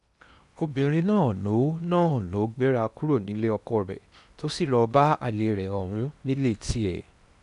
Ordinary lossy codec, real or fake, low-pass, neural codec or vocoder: none; fake; 10.8 kHz; codec, 16 kHz in and 24 kHz out, 0.8 kbps, FocalCodec, streaming, 65536 codes